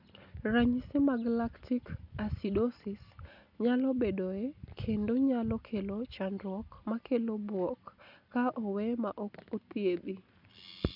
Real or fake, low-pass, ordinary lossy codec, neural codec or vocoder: real; 5.4 kHz; none; none